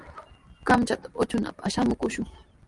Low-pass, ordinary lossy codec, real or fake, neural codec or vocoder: 10.8 kHz; Opus, 32 kbps; real; none